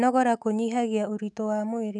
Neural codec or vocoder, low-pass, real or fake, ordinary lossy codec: autoencoder, 48 kHz, 128 numbers a frame, DAC-VAE, trained on Japanese speech; 10.8 kHz; fake; none